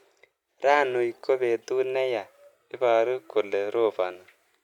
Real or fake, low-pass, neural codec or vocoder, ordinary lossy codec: real; 19.8 kHz; none; MP3, 96 kbps